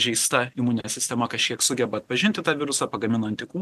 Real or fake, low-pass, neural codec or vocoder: real; 14.4 kHz; none